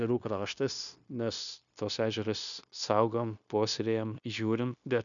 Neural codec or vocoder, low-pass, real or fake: codec, 16 kHz, 0.9 kbps, LongCat-Audio-Codec; 7.2 kHz; fake